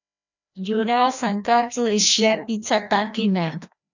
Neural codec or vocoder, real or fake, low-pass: codec, 16 kHz, 1 kbps, FreqCodec, larger model; fake; 7.2 kHz